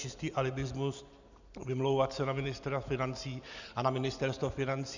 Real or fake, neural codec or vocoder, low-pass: real; none; 7.2 kHz